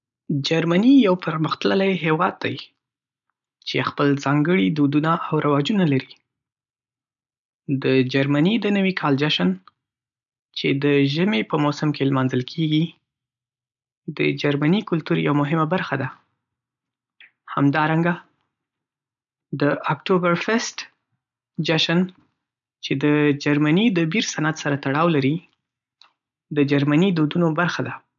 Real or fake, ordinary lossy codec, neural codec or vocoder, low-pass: real; none; none; 7.2 kHz